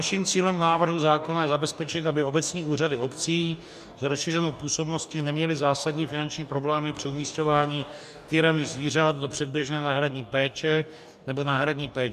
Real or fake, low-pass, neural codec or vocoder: fake; 14.4 kHz; codec, 44.1 kHz, 2.6 kbps, DAC